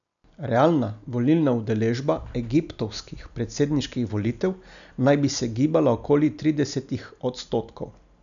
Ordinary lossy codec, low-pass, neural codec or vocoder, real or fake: none; 7.2 kHz; none; real